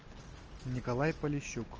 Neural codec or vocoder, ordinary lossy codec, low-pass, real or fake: none; Opus, 24 kbps; 7.2 kHz; real